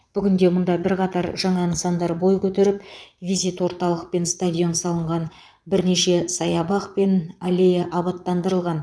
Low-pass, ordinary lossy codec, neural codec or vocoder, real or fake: none; none; vocoder, 22.05 kHz, 80 mel bands, Vocos; fake